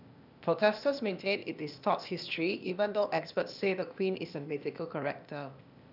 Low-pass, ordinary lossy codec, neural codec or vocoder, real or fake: 5.4 kHz; none; codec, 16 kHz, 0.8 kbps, ZipCodec; fake